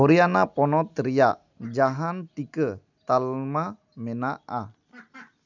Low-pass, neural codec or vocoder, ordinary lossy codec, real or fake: 7.2 kHz; none; none; real